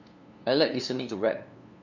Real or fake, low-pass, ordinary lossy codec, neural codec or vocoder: fake; 7.2 kHz; none; codec, 16 kHz, 2 kbps, FunCodec, trained on LibriTTS, 25 frames a second